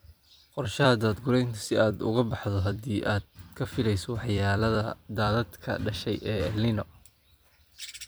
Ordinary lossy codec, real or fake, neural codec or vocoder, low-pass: none; fake; vocoder, 44.1 kHz, 128 mel bands every 256 samples, BigVGAN v2; none